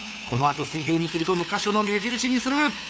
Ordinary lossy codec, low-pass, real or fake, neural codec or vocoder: none; none; fake; codec, 16 kHz, 2 kbps, FunCodec, trained on LibriTTS, 25 frames a second